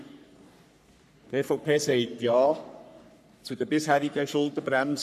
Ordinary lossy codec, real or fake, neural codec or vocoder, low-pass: none; fake; codec, 44.1 kHz, 3.4 kbps, Pupu-Codec; 14.4 kHz